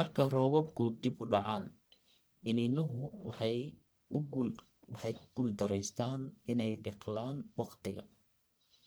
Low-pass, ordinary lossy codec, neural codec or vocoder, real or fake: none; none; codec, 44.1 kHz, 1.7 kbps, Pupu-Codec; fake